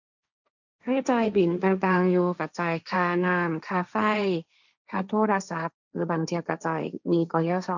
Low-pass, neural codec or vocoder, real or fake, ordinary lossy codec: 7.2 kHz; codec, 16 kHz, 1.1 kbps, Voila-Tokenizer; fake; none